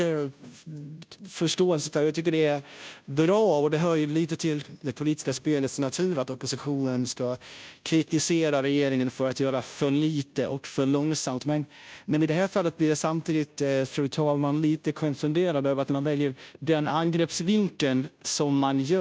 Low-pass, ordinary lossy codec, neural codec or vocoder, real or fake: none; none; codec, 16 kHz, 0.5 kbps, FunCodec, trained on Chinese and English, 25 frames a second; fake